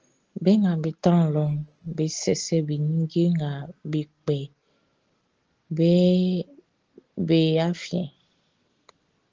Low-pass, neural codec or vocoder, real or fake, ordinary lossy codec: 7.2 kHz; none; real; Opus, 16 kbps